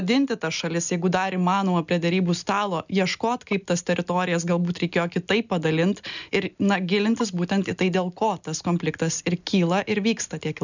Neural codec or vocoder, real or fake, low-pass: none; real; 7.2 kHz